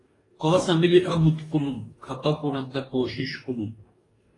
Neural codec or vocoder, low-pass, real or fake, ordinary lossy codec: codec, 44.1 kHz, 2.6 kbps, DAC; 10.8 kHz; fake; AAC, 32 kbps